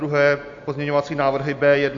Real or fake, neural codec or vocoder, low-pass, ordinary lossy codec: real; none; 7.2 kHz; AAC, 64 kbps